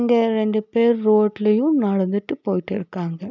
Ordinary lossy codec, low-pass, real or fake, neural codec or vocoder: none; 7.2 kHz; real; none